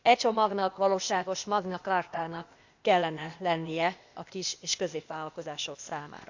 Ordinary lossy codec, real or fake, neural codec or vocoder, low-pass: Opus, 64 kbps; fake; codec, 16 kHz, 0.8 kbps, ZipCodec; 7.2 kHz